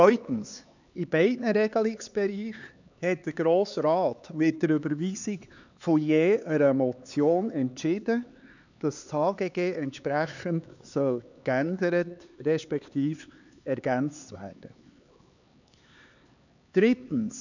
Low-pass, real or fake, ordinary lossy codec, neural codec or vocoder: 7.2 kHz; fake; none; codec, 16 kHz, 4 kbps, X-Codec, HuBERT features, trained on LibriSpeech